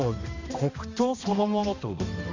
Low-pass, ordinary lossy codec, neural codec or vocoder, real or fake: 7.2 kHz; none; codec, 16 kHz, 1 kbps, X-Codec, HuBERT features, trained on balanced general audio; fake